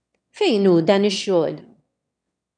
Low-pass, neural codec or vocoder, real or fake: 9.9 kHz; autoencoder, 22.05 kHz, a latent of 192 numbers a frame, VITS, trained on one speaker; fake